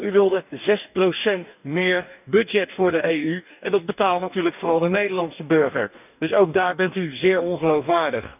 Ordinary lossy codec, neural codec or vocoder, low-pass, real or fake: none; codec, 44.1 kHz, 2.6 kbps, DAC; 3.6 kHz; fake